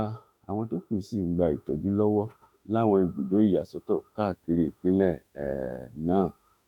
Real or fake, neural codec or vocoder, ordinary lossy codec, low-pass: fake; autoencoder, 48 kHz, 32 numbers a frame, DAC-VAE, trained on Japanese speech; none; 19.8 kHz